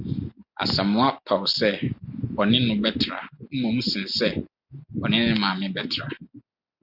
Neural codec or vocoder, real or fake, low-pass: none; real; 5.4 kHz